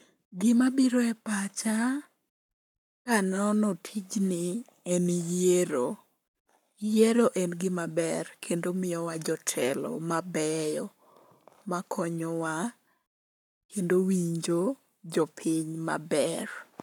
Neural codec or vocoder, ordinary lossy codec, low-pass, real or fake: codec, 44.1 kHz, 7.8 kbps, Pupu-Codec; none; 19.8 kHz; fake